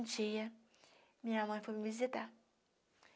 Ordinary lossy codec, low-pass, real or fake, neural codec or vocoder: none; none; real; none